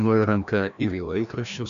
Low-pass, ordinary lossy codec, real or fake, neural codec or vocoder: 7.2 kHz; AAC, 96 kbps; fake; codec, 16 kHz, 1 kbps, FreqCodec, larger model